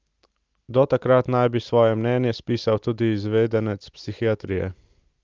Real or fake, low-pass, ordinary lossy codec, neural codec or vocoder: real; 7.2 kHz; Opus, 32 kbps; none